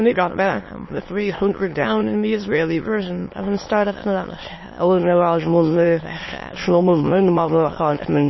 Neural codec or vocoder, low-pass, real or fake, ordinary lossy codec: autoencoder, 22.05 kHz, a latent of 192 numbers a frame, VITS, trained on many speakers; 7.2 kHz; fake; MP3, 24 kbps